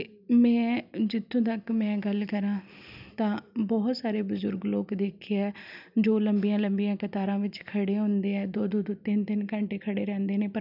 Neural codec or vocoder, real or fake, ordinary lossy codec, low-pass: none; real; none; 5.4 kHz